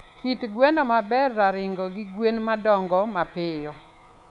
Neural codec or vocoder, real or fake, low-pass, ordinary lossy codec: codec, 24 kHz, 3.1 kbps, DualCodec; fake; 10.8 kHz; none